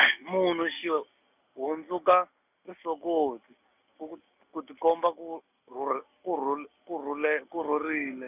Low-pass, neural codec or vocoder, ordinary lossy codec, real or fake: 3.6 kHz; none; none; real